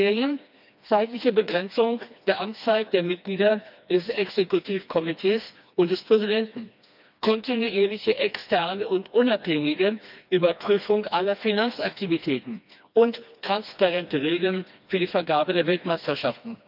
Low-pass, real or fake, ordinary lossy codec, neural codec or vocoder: 5.4 kHz; fake; none; codec, 16 kHz, 2 kbps, FreqCodec, smaller model